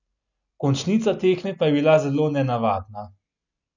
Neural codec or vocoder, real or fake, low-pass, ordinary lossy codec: none; real; 7.2 kHz; none